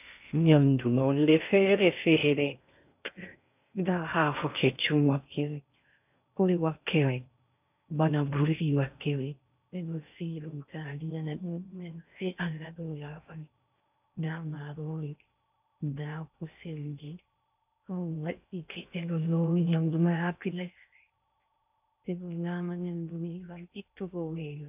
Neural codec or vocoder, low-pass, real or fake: codec, 16 kHz in and 24 kHz out, 0.6 kbps, FocalCodec, streaming, 4096 codes; 3.6 kHz; fake